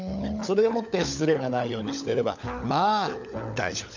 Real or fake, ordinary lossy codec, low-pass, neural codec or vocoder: fake; none; 7.2 kHz; codec, 16 kHz, 16 kbps, FunCodec, trained on LibriTTS, 50 frames a second